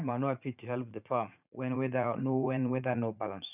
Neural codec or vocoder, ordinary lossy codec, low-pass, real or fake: vocoder, 44.1 kHz, 80 mel bands, Vocos; none; 3.6 kHz; fake